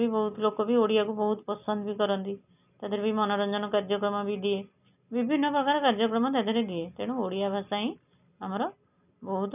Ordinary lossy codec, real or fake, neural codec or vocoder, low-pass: none; real; none; 3.6 kHz